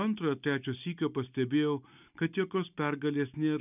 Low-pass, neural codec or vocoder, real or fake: 3.6 kHz; none; real